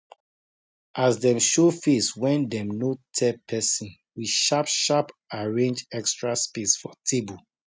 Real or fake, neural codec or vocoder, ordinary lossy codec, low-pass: real; none; none; none